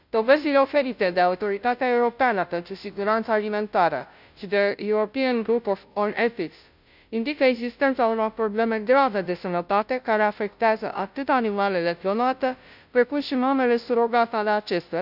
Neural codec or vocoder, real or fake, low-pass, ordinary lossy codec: codec, 16 kHz, 0.5 kbps, FunCodec, trained on Chinese and English, 25 frames a second; fake; 5.4 kHz; none